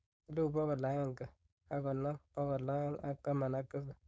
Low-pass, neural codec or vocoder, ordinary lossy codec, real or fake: none; codec, 16 kHz, 4.8 kbps, FACodec; none; fake